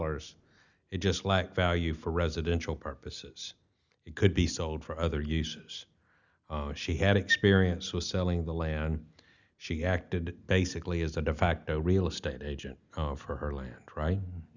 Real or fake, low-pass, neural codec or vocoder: real; 7.2 kHz; none